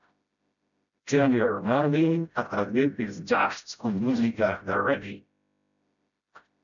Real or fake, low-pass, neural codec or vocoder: fake; 7.2 kHz; codec, 16 kHz, 0.5 kbps, FreqCodec, smaller model